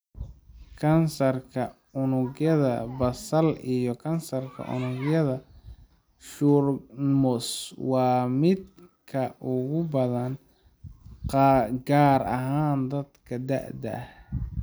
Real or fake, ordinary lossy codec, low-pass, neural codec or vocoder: real; none; none; none